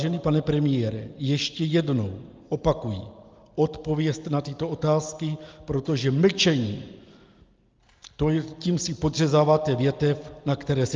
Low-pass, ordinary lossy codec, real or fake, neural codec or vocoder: 7.2 kHz; Opus, 24 kbps; real; none